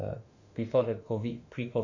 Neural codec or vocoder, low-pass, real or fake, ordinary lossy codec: autoencoder, 48 kHz, 32 numbers a frame, DAC-VAE, trained on Japanese speech; 7.2 kHz; fake; none